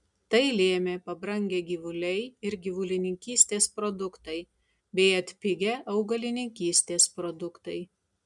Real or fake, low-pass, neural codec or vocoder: real; 10.8 kHz; none